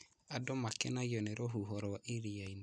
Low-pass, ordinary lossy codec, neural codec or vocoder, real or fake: 9.9 kHz; none; none; real